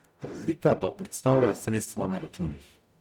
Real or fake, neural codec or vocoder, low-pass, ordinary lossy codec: fake; codec, 44.1 kHz, 0.9 kbps, DAC; 19.8 kHz; none